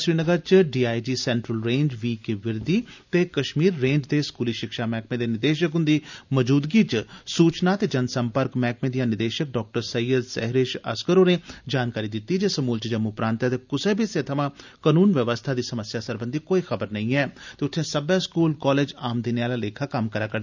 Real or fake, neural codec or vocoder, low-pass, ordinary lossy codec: real; none; 7.2 kHz; none